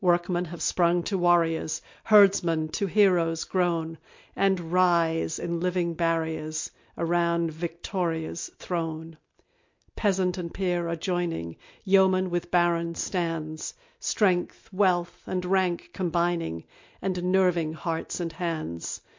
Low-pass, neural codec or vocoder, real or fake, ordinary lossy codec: 7.2 kHz; none; real; MP3, 48 kbps